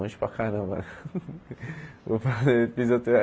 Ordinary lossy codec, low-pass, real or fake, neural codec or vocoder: none; none; real; none